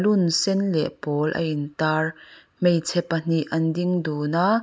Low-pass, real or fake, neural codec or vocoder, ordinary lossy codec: none; real; none; none